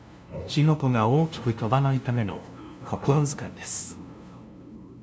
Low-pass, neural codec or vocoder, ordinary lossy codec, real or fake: none; codec, 16 kHz, 0.5 kbps, FunCodec, trained on LibriTTS, 25 frames a second; none; fake